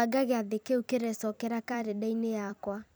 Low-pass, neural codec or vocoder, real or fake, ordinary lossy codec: none; vocoder, 44.1 kHz, 128 mel bands every 256 samples, BigVGAN v2; fake; none